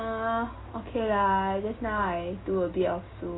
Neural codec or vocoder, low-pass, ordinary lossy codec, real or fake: none; 7.2 kHz; AAC, 16 kbps; real